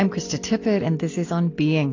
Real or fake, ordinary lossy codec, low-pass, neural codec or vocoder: real; AAC, 32 kbps; 7.2 kHz; none